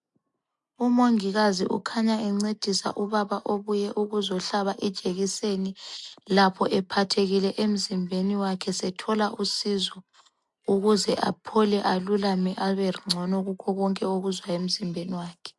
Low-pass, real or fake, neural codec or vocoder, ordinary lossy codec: 10.8 kHz; real; none; MP3, 64 kbps